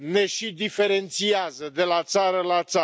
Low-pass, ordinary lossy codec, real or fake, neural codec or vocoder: none; none; real; none